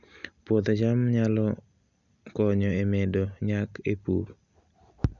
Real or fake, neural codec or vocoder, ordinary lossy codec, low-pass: real; none; none; 7.2 kHz